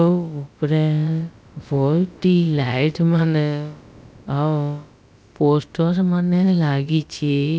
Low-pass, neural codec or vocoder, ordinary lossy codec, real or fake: none; codec, 16 kHz, about 1 kbps, DyCAST, with the encoder's durations; none; fake